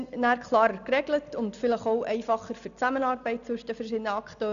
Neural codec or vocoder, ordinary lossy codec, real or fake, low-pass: none; none; real; 7.2 kHz